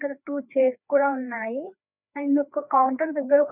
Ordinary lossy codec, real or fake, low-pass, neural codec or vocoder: none; fake; 3.6 kHz; codec, 16 kHz, 2 kbps, FreqCodec, larger model